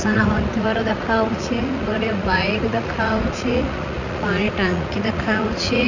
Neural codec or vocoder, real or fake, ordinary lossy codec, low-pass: vocoder, 44.1 kHz, 80 mel bands, Vocos; fake; none; 7.2 kHz